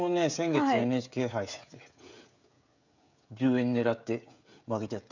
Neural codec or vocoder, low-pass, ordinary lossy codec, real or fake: codec, 16 kHz, 16 kbps, FreqCodec, smaller model; 7.2 kHz; none; fake